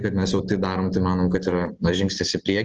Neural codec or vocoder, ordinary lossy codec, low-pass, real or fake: none; Opus, 16 kbps; 7.2 kHz; real